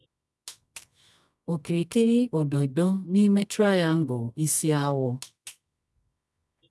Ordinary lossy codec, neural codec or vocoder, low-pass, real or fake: none; codec, 24 kHz, 0.9 kbps, WavTokenizer, medium music audio release; none; fake